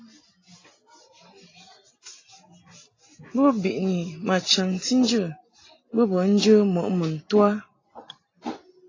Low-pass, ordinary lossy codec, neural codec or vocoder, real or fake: 7.2 kHz; AAC, 32 kbps; none; real